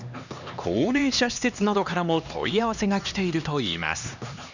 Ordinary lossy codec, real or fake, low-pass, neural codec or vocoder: none; fake; 7.2 kHz; codec, 16 kHz, 2 kbps, X-Codec, HuBERT features, trained on LibriSpeech